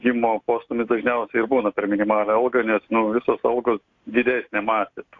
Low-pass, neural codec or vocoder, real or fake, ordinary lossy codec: 7.2 kHz; none; real; AAC, 48 kbps